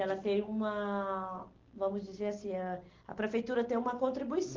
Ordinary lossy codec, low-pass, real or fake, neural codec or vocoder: Opus, 16 kbps; 7.2 kHz; real; none